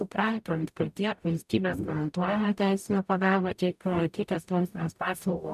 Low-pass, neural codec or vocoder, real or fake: 14.4 kHz; codec, 44.1 kHz, 0.9 kbps, DAC; fake